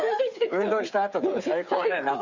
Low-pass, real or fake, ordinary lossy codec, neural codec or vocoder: 7.2 kHz; fake; Opus, 64 kbps; codec, 16 kHz, 8 kbps, FreqCodec, smaller model